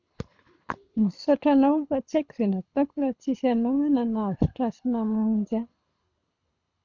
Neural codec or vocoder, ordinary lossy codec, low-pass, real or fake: codec, 24 kHz, 6 kbps, HILCodec; none; 7.2 kHz; fake